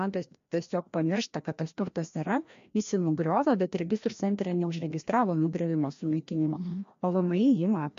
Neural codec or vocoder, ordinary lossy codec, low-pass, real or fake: codec, 16 kHz, 1 kbps, FreqCodec, larger model; MP3, 48 kbps; 7.2 kHz; fake